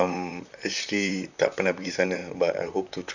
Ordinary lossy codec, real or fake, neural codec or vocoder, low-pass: none; fake; vocoder, 44.1 kHz, 128 mel bands, Pupu-Vocoder; 7.2 kHz